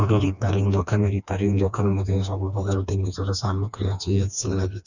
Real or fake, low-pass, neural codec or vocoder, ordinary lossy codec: fake; 7.2 kHz; codec, 16 kHz, 2 kbps, FreqCodec, smaller model; none